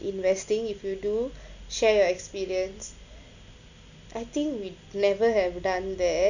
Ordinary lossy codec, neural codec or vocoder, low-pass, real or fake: none; none; 7.2 kHz; real